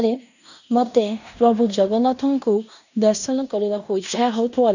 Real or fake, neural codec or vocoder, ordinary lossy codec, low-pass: fake; codec, 16 kHz in and 24 kHz out, 0.9 kbps, LongCat-Audio-Codec, fine tuned four codebook decoder; none; 7.2 kHz